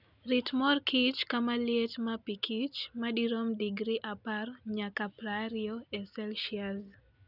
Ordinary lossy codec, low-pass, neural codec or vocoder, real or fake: none; 5.4 kHz; none; real